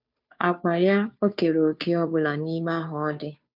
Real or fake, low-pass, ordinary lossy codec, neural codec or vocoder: fake; 5.4 kHz; none; codec, 16 kHz, 2 kbps, FunCodec, trained on Chinese and English, 25 frames a second